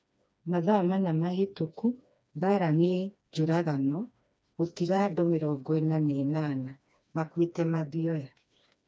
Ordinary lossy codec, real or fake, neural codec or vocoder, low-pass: none; fake; codec, 16 kHz, 2 kbps, FreqCodec, smaller model; none